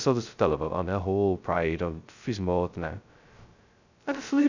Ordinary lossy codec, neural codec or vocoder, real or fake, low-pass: none; codec, 16 kHz, 0.2 kbps, FocalCodec; fake; 7.2 kHz